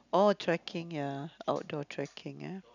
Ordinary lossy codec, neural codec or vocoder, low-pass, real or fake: none; none; 7.2 kHz; real